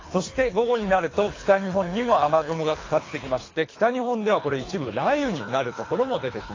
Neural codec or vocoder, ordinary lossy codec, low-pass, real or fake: codec, 24 kHz, 3 kbps, HILCodec; AAC, 32 kbps; 7.2 kHz; fake